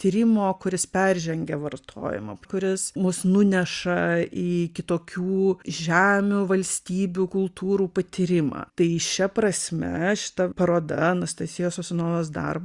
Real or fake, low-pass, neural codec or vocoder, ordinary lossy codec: real; 10.8 kHz; none; Opus, 64 kbps